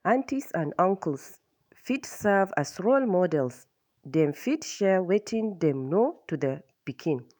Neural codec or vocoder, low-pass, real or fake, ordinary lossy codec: autoencoder, 48 kHz, 128 numbers a frame, DAC-VAE, trained on Japanese speech; none; fake; none